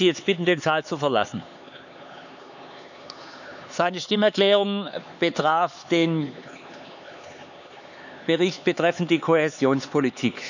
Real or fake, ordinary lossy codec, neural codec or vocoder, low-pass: fake; none; codec, 16 kHz, 4 kbps, X-Codec, HuBERT features, trained on LibriSpeech; 7.2 kHz